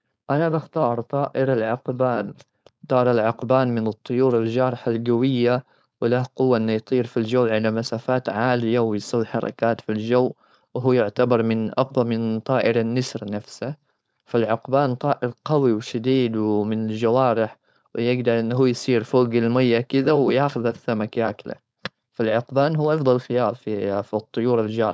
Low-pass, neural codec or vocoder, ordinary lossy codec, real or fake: none; codec, 16 kHz, 4.8 kbps, FACodec; none; fake